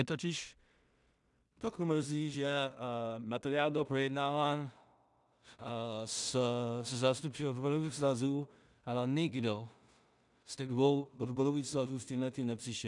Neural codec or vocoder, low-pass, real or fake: codec, 16 kHz in and 24 kHz out, 0.4 kbps, LongCat-Audio-Codec, two codebook decoder; 10.8 kHz; fake